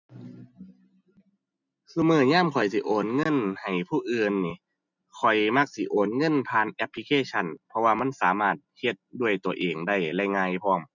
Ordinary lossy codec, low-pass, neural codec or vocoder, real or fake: none; 7.2 kHz; none; real